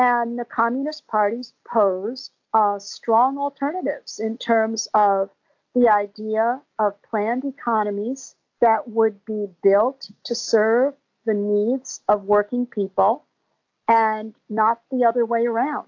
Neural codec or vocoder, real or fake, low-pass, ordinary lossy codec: none; real; 7.2 kHz; AAC, 48 kbps